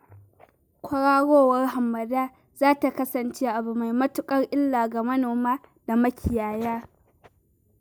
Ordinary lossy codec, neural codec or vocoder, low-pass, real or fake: none; none; none; real